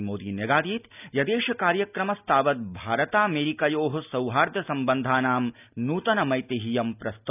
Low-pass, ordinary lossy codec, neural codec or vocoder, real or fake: 3.6 kHz; none; none; real